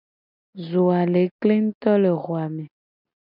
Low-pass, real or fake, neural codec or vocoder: 5.4 kHz; real; none